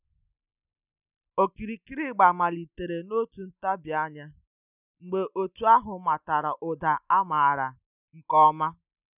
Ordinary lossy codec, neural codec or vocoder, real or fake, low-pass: none; none; real; 3.6 kHz